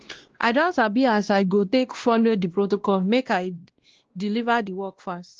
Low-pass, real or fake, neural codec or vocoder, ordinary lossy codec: 7.2 kHz; fake; codec, 16 kHz, 1 kbps, X-Codec, WavLM features, trained on Multilingual LibriSpeech; Opus, 16 kbps